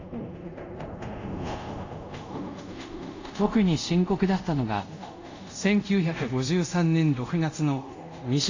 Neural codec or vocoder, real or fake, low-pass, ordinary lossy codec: codec, 24 kHz, 0.5 kbps, DualCodec; fake; 7.2 kHz; AAC, 48 kbps